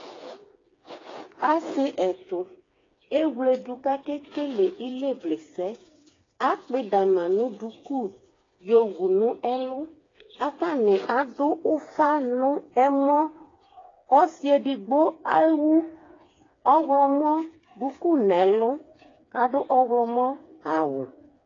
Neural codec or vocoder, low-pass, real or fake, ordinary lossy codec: codec, 16 kHz, 4 kbps, FreqCodec, smaller model; 7.2 kHz; fake; AAC, 32 kbps